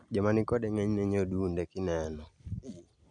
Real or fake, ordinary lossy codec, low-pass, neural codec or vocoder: real; none; 9.9 kHz; none